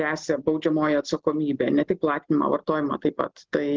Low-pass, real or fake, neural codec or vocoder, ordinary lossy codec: 7.2 kHz; real; none; Opus, 16 kbps